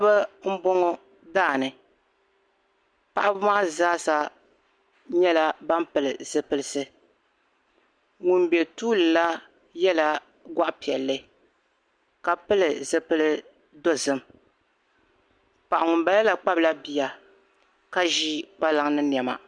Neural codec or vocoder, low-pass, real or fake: none; 9.9 kHz; real